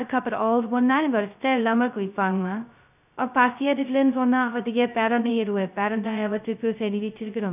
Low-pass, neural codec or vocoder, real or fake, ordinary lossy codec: 3.6 kHz; codec, 16 kHz, 0.2 kbps, FocalCodec; fake; none